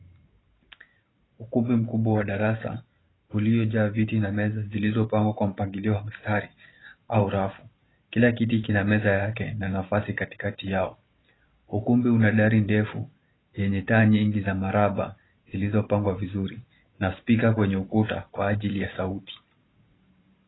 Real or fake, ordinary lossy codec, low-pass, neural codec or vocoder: fake; AAC, 16 kbps; 7.2 kHz; vocoder, 44.1 kHz, 128 mel bands every 512 samples, BigVGAN v2